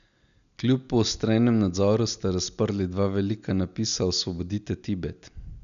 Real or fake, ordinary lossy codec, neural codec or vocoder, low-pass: real; none; none; 7.2 kHz